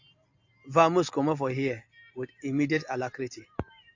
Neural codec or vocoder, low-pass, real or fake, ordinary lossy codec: none; 7.2 kHz; real; AAC, 48 kbps